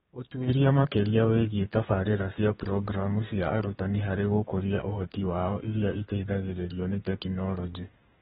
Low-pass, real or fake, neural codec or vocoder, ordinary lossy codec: 14.4 kHz; fake; codec, 32 kHz, 1.9 kbps, SNAC; AAC, 16 kbps